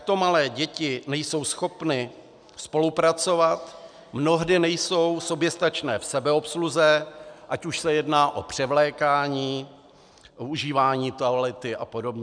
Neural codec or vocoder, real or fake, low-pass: none; real; 9.9 kHz